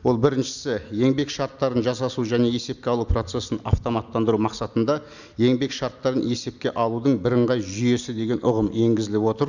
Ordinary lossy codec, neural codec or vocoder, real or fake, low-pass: none; none; real; 7.2 kHz